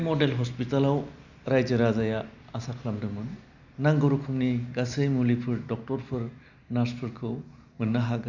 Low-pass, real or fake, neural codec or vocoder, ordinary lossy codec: 7.2 kHz; real; none; none